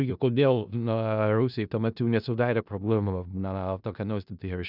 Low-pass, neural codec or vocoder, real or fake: 5.4 kHz; codec, 16 kHz in and 24 kHz out, 0.4 kbps, LongCat-Audio-Codec, four codebook decoder; fake